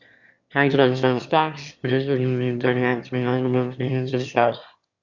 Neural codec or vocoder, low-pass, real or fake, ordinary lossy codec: autoencoder, 22.05 kHz, a latent of 192 numbers a frame, VITS, trained on one speaker; 7.2 kHz; fake; Opus, 64 kbps